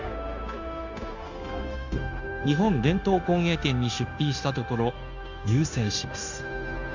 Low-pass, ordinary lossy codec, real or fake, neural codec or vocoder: 7.2 kHz; none; fake; codec, 16 kHz, 0.9 kbps, LongCat-Audio-Codec